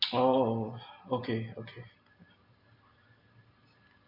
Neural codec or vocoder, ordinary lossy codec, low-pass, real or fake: none; none; 5.4 kHz; real